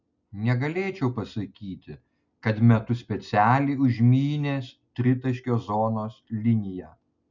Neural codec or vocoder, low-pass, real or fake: none; 7.2 kHz; real